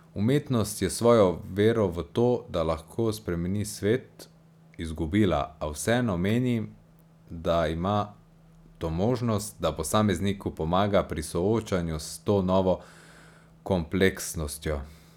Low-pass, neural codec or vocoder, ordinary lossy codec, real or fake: 19.8 kHz; none; none; real